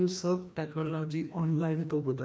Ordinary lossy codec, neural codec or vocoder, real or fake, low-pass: none; codec, 16 kHz, 1 kbps, FreqCodec, larger model; fake; none